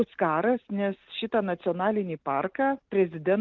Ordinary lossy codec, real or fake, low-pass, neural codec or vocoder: Opus, 32 kbps; real; 7.2 kHz; none